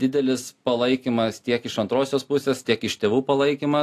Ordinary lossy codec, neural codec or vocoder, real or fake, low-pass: AAC, 64 kbps; none; real; 14.4 kHz